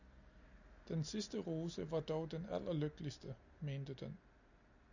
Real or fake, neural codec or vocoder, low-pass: real; none; 7.2 kHz